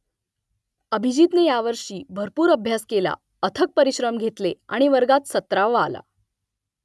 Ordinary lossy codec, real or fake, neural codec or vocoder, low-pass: none; real; none; none